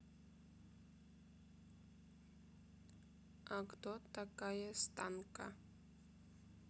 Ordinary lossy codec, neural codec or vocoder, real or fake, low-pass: none; none; real; none